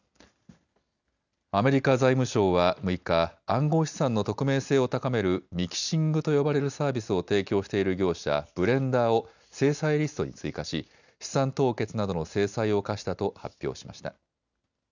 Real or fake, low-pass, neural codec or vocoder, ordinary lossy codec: real; 7.2 kHz; none; none